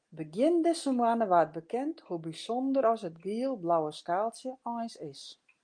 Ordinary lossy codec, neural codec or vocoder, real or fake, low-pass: Opus, 32 kbps; none; real; 9.9 kHz